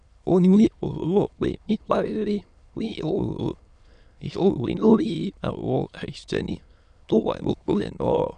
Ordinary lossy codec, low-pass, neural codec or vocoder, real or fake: none; 9.9 kHz; autoencoder, 22.05 kHz, a latent of 192 numbers a frame, VITS, trained on many speakers; fake